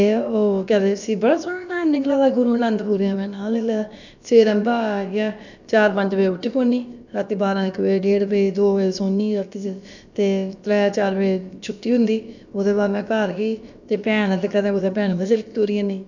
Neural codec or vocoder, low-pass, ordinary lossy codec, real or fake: codec, 16 kHz, about 1 kbps, DyCAST, with the encoder's durations; 7.2 kHz; none; fake